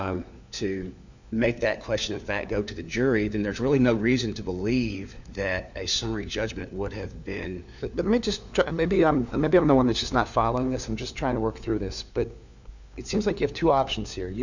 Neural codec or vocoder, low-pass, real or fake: codec, 16 kHz, 4 kbps, FunCodec, trained on LibriTTS, 50 frames a second; 7.2 kHz; fake